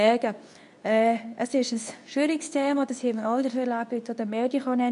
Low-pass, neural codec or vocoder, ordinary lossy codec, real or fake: 10.8 kHz; codec, 24 kHz, 0.9 kbps, WavTokenizer, medium speech release version 2; none; fake